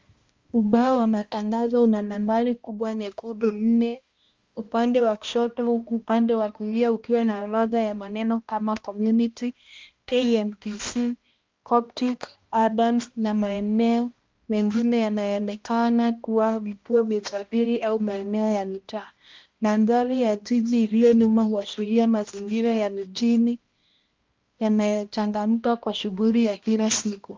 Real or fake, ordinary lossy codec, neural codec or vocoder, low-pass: fake; Opus, 32 kbps; codec, 16 kHz, 1 kbps, X-Codec, HuBERT features, trained on balanced general audio; 7.2 kHz